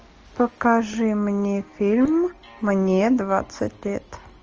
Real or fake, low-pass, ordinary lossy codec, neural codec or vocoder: real; 7.2 kHz; Opus, 24 kbps; none